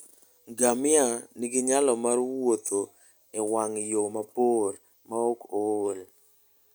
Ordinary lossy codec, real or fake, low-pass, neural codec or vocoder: none; real; none; none